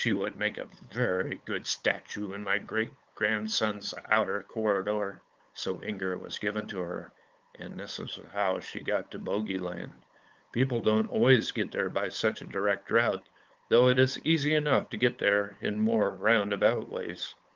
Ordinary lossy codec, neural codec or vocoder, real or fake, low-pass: Opus, 32 kbps; codec, 16 kHz, 8 kbps, FunCodec, trained on LibriTTS, 25 frames a second; fake; 7.2 kHz